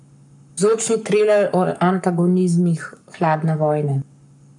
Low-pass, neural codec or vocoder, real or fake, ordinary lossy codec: 10.8 kHz; codec, 44.1 kHz, 7.8 kbps, Pupu-Codec; fake; none